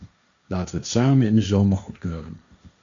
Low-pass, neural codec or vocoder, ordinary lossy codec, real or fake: 7.2 kHz; codec, 16 kHz, 1.1 kbps, Voila-Tokenizer; MP3, 64 kbps; fake